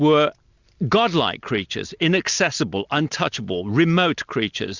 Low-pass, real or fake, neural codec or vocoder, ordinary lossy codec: 7.2 kHz; real; none; Opus, 64 kbps